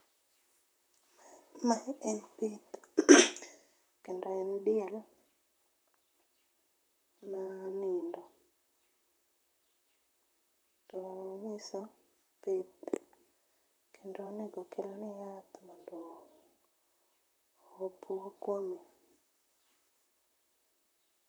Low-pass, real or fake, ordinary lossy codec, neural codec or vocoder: none; fake; none; vocoder, 44.1 kHz, 128 mel bands, Pupu-Vocoder